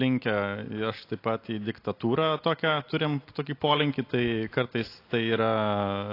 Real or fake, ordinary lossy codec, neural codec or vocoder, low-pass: real; AAC, 32 kbps; none; 5.4 kHz